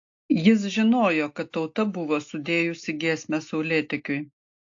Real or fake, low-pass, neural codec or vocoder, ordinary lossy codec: real; 7.2 kHz; none; AAC, 48 kbps